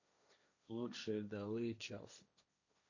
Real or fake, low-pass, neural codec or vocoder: fake; 7.2 kHz; codec, 16 kHz, 1.1 kbps, Voila-Tokenizer